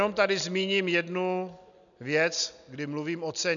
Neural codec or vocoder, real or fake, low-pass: none; real; 7.2 kHz